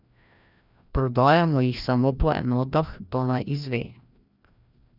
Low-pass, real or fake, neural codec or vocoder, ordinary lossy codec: 5.4 kHz; fake; codec, 16 kHz, 1 kbps, FreqCodec, larger model; none